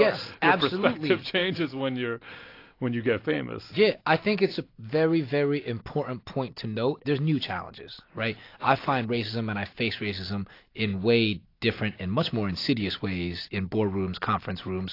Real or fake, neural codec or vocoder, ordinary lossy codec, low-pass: real; none; AAC, 32 kbps; 5.4 kHz